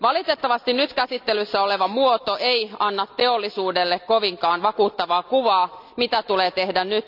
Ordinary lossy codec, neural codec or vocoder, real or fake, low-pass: none; none; real; 5.4 kHz